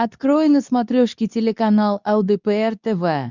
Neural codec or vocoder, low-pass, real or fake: codec, 24 kHz, 0.9 kbps, WavTokenizer, medium speech release version 2; 7.2 kHz; fake